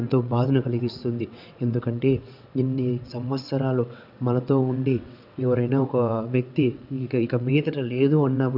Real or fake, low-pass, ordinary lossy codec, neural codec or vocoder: fake; 5.4 kHz; none; vocoder, 22.05 kHz, 80 mel bands, WaveNeXt